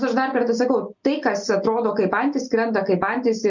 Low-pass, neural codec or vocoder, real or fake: 7.2 kHz; none; real